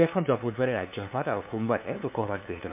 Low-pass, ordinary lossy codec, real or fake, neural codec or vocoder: 3.6 kHz; MP3, 32 kbps; fake; codec, 16 kHz, 2 kbps, FunCodec, trained on LibriTTS, 25 frames a second